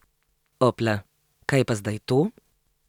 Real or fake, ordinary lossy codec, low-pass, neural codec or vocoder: real; none; 19.8 kHz; none